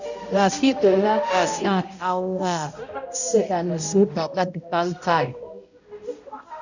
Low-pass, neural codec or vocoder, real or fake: 7.2 kHz; codec, 16 kHz, 0.5 kbps, X-Codec, HuBERT features, trained on balanced general audio; fake